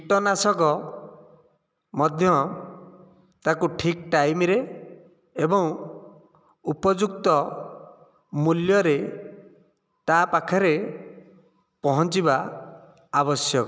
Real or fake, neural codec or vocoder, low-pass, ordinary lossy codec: real; none; none; none